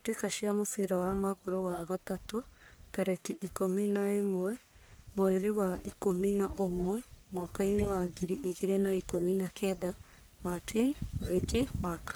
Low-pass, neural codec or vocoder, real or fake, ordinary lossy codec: none; codec, 44.1 kHz, 1.7 kbps, Pupu-Codec; fake; none